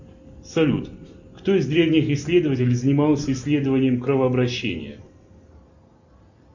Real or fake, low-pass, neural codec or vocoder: real; 7.2 kHz; none